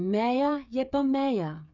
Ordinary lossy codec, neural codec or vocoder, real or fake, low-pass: none; codec, 16 kHz, 8 kbps, FreqCodec, smaller model; fake; 7.2 kHz